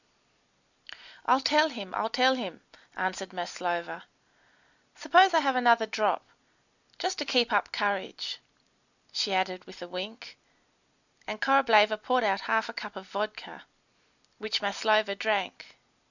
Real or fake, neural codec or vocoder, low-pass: real; none; 7.2 kHz